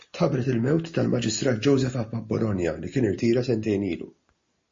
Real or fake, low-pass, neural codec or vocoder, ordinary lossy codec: real; 7.2 kHz; none; MP3, 32 kbps